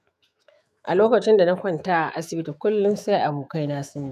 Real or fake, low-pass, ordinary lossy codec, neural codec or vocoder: fake; 14.4 kHz; none; autoencoder, 48 kHz, 128 numbers a frame, DAC-VAE, trained on Japanese speech